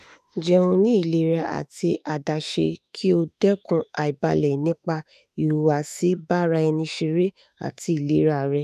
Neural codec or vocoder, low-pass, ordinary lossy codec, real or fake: autoencoder, 48 kHz, 32 numbers a frame, DAC-VAE, trained on Japanese speech; 14.4 kHz; none; fake